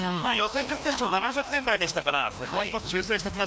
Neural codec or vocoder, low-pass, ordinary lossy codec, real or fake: codec, 16 kHz, 1 kbps, FreqCodec, larger model; none; none; fake